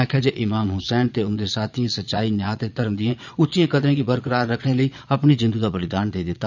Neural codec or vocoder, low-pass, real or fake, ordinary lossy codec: vocoder, 22.05 kHz, 80 mel bands, Vocos; 7.2 kHz; fake; none